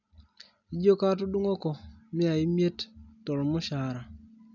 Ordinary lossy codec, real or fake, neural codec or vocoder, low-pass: none; real; none; 7.2 kHz